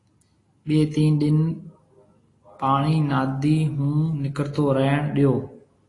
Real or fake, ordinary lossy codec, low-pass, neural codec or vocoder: real; AAC, 32 kbps; 10.8 kHz; none